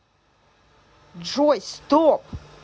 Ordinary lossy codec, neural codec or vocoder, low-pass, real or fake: none; none; none; real